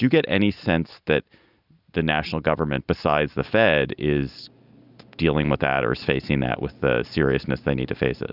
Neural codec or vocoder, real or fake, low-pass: none; real; 5.4 kHz